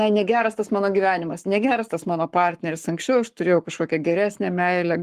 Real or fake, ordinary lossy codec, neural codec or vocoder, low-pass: fake; Opus, 24 kbps; codec, 44.1 kHz, 7.8 kbps, Pupu-Codec; 14.4 kHz